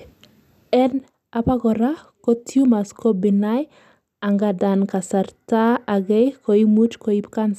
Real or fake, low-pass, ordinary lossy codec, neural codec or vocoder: real; 14.4 kHz; none; none